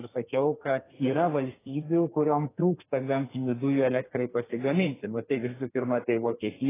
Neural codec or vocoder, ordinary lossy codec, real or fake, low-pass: codec, 32 kHz, 1.9 kbps, SNAC; AAC, 16 kbps; fake; 3.6 kHz